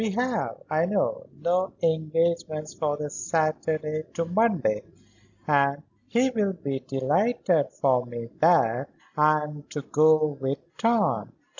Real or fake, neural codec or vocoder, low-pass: real; none; 7.2 kHz